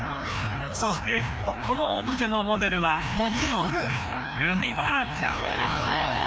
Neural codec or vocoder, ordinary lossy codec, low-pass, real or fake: codec, 16 kHz, 1 kbps, FreqCodec, larger model; none; none; fake